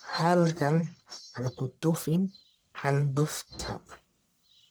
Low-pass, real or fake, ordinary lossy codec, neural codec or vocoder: none; fake; none; codec, 44.1 kHz, 1.7 kbps, Pupu-Codec